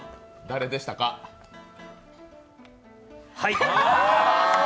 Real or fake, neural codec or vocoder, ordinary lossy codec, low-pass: real; none; none; none